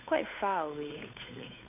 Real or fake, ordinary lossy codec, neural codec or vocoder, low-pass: fake; none; codec, 16 kHz, 8 kbps, FunCodec, trained on Chinese and English, 25 frames a second; 3.6 kHz